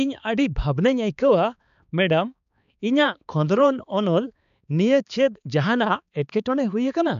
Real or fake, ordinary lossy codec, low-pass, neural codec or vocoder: fake; MP3, 96 kbps; 7.2 kHz; codec, 16 kHz, 4 kbps, X-Codec, HuBERT features, trained on balanced general audio